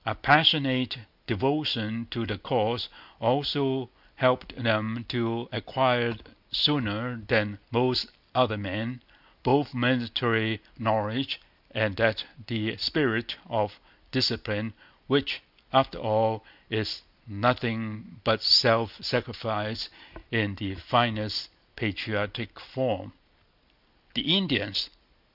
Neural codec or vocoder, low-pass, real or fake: none; 5.4 kHz; real